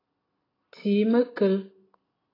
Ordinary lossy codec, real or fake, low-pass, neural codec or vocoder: AAC, 24 kbps; real; 5.4 kHz; none